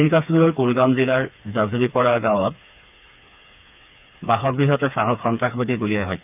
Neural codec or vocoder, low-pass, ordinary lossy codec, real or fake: codec, 16 kHz, 4 kbps, FreqCodec, smaller model; 3.6 kHz; none; fake